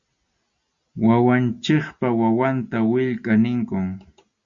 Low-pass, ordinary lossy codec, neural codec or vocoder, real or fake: 7.2 kHz; Opus, 64 kbps; none; real